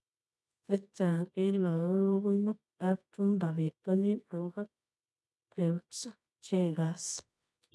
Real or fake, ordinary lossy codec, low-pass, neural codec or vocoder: fake; none; none; codec, 24 kHz, 0.9 kbps, WavTokenizer, medium music audio release